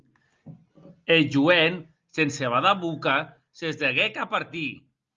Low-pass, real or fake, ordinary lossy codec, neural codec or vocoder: 7.2 kHz; real; Opus, 32 kbps; none